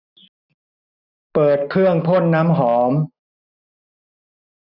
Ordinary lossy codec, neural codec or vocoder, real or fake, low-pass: none; none; real; 5.4 kHz